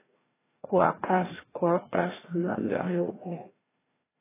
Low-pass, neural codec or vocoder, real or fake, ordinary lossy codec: 3.6 kHz; codec, 16 kHz, 1 kbps, FreqCodec, larger model; fake; AAC, 16 kbps